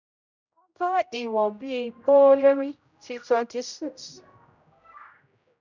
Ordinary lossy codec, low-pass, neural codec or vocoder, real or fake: none; 7.2 kHz; codec, 16 kHz, 0.5 kbps, X-Codec, HuBERT features, trained on general audio; fake